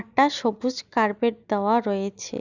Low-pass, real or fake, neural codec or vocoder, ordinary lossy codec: 7.2 kHz; real; none; Opus, 64 kbps